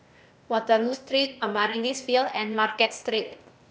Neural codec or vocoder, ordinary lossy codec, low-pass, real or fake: codec, 16 kHz, 0.8 kbps, ZipCodec; none; none; fake